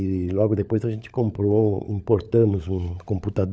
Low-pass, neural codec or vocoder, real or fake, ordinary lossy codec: none; codec, 16 kHz, 16 kbps, FunCodec, trained on LibriTTS, 50 frames a second; fake; none